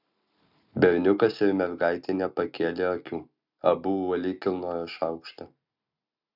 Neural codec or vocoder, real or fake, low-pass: none; real; 5.4 kHz